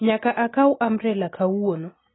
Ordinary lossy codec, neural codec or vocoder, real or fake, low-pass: AAC, 16 kbps; none; real; 7.2 kHz